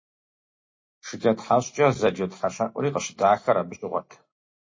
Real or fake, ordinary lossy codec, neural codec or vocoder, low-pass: real; MP3, 32 kbps; none; 7.2 kHz